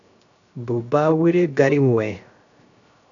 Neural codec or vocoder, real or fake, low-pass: codec, 16 kHz, 0.3 kbps, FocalCodec; fake; 7.2 kHz